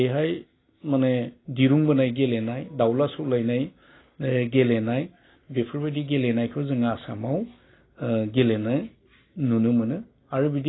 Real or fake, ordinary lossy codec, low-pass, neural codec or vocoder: real; AAC, 16 kbps; 7.2 kHz; none